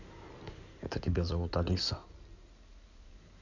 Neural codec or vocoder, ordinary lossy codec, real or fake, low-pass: codec, 16 kHz in and 24 kHz out, 2.2 kbps, FireRedTTS-2 codec; none; fake; 7.2 kHz